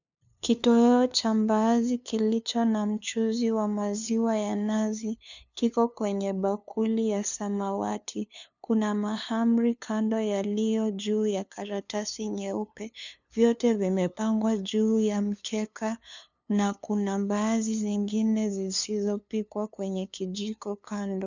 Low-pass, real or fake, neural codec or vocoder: 7.2 kHz; fake; codec, 16 kHz, 2 kbps, FunCodec, trained on LibriTTS, 25 frames a second